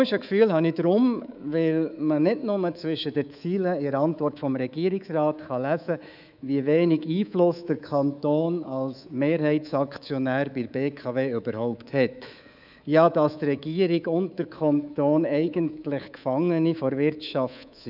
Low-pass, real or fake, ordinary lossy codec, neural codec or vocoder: 5.4 kHz; fake; none; codec, 24 kHz, 3.1 kbps, DualCodec